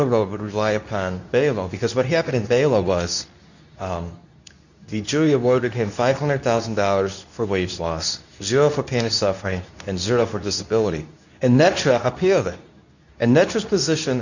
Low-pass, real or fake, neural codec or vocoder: 7.2 kHz; fake; codec, 24 kHz, 0.9 kbps, WavTokenizer, medium speech release version 2